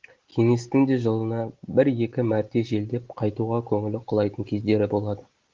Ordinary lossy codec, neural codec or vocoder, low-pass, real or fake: Opus, 16 kbps; none; 7.2 kHz; real